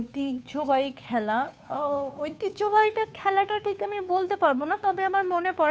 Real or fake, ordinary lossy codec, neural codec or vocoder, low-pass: fake; none; codec, 16 kHz, 2 kbps, FunCodec, trained on Chinese and English, 25 frames a second; none